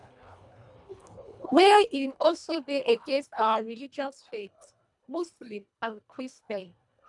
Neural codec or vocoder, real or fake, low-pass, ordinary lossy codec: codec, 24 kHz, 1.5 kbps, HILCodec; fake; 10.8 kHz; none